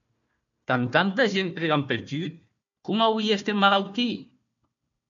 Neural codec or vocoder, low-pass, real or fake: codec, 16 kHz, 1 kbps, FunCodec, trained on Chinese and English, 50 frames a second; 7.2 kHz; fake